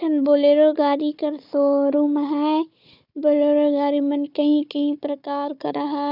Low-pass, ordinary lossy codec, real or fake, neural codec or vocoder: 5.4 kHz; none; fake; codec, 16 kHz, 4 kbps, FunCodec, trained on Chinese and English, 50 frames a second